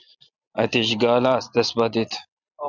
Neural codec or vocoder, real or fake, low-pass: none; real; 7.2 kHz